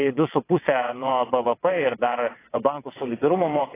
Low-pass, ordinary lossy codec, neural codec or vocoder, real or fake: 3.6 kHz; AAC, 16 kbps; vocoder, 22.05 kHz, 80 mel bands, WaveNeXt; fake